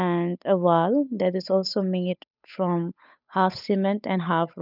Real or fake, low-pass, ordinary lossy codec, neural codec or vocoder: fake; 5.4 kHz; none; codec, 16 kHz, 8 kbps, FunCodec, trained on Chinese and English, 25 frames a second